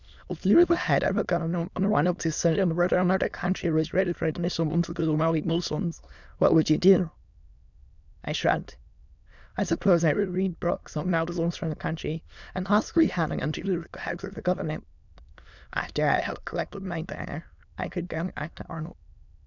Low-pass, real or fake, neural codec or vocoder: 7.2 kHz; fake; autoencoder, 22.05 kHz, a latent of 192 numbers a frame, VITS, trained on many speakers